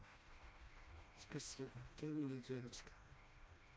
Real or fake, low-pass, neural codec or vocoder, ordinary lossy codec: fake; none; codec, 16 kHz, 1 kbps, FreqCodec, smaller model; none